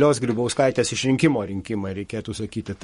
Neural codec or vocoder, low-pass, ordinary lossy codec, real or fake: codec, 44.1 kHz, 7.8 kbps, Pupu-Codec; 19.8 kHz; MP3, 48 kbps; fake